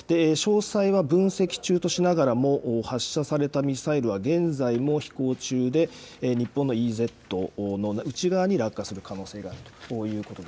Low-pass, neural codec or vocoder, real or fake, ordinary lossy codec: none; none; real; none